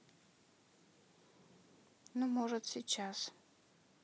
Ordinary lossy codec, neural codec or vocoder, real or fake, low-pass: none; none; real; none